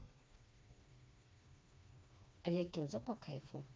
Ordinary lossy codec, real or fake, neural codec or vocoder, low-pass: none; fake; codec, 16 kHz, 2 kbps, FreqCodec, smaller model; none